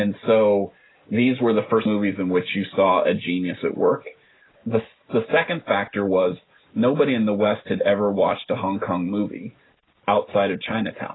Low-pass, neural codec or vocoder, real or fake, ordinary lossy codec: 7.2 kHz; none; real; AAC, 16 kbps